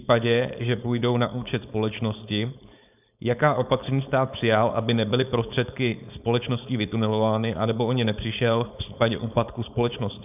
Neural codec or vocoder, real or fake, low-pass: codec, 16 kHz, 4.8 kbps, FACodec; fake; 3.6 kHz